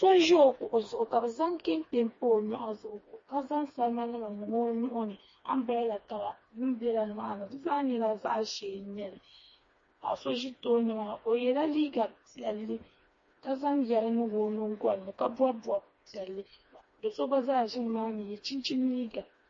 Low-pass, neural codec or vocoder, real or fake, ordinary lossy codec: 7.2 kHz; codec, 16 kHz, 2 kbps, FreqCodec, smaller model; fake; MP3, 32 kbps